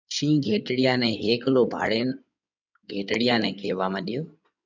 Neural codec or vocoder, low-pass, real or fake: codec, 16 kHz in and 24 kHz out, 2.2 kbps, FireRedTTS-2 codec; 7.2 kHz; fake